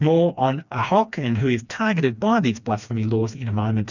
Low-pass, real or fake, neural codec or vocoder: 7.2 kHz; fake; codec, 16 kHz, 2 kbps, FreqCodec, smaller model